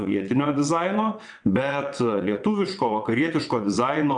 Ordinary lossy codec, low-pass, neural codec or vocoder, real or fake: MP3, 96 kbps; 9.9 kHz; vocoder, 22.05 kHz, 80 mel bands, WaveNeXt; fake